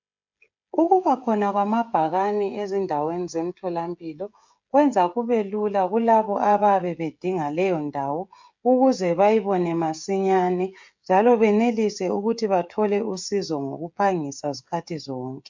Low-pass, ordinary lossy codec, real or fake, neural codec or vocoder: 7.2 kHz; MP3, 64 kbps; fake; codec, 16 kHz, 16 kbps, FreqCodec, smaller model